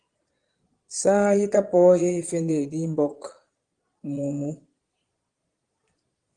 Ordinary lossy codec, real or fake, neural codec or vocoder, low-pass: Opus, 24 kbps; fake; vocoder, 22.05 kHz, 80 mel bands, Vocos; 9.9 kHz